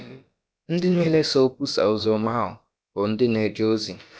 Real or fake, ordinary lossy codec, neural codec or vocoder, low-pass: fake; none; codec, 16 kHz, about 1 kbps, DyCAST, with the encoder's durations; none